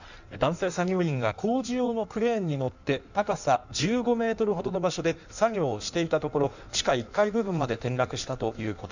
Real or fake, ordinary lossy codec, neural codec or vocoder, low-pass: fake; none; codec, 16 kHz in and 24 kHz out, 1.1 kbps, FireRedTTS-2 codec; 7.2 kHz